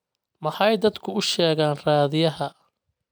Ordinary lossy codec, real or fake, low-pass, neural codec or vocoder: none; real; none; none